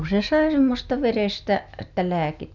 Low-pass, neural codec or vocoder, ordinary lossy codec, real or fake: 7.2 kHz; none; none; real